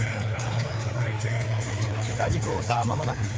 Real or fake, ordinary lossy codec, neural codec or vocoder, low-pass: fake; none; codec, 16 kHz, 4 kbps, FreqCodec, larger model; none